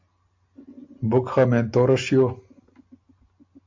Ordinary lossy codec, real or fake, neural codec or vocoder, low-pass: AAC, 48 kbps; real; none; 7.2 kHz